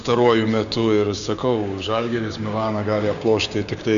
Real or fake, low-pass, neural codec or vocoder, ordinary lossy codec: fake; 7.2 kHz; codec, 16 kHz, 6 kbps, DAC; MP3, 96 kbps